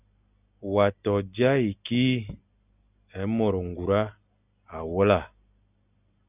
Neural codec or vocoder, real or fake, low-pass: none; real; 3.6 kHz